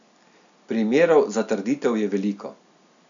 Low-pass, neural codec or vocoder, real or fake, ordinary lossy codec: 7.2 kHz; none; real; none